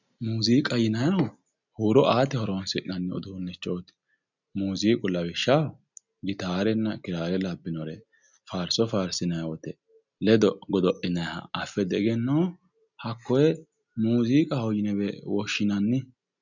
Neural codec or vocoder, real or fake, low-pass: none; real; 7.2 kHz